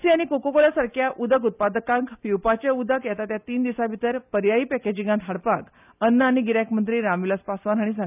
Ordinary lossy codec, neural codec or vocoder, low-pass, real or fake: none; none; 3.6 kHz; real